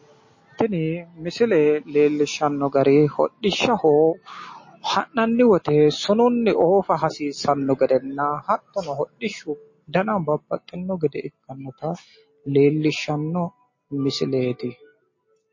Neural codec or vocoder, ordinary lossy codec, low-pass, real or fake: none; MP3, 32 kbps; 7.2 kHz; real